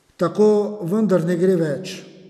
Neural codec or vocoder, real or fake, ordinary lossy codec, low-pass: none; real; none; 14.4 kHz